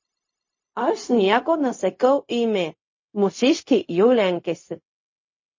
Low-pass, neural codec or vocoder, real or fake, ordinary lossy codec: 7.2 kHz; codec, 16 kHz, 0.4 kbps, LongCat-Audio-Codec; fake; MP3, 32 kbps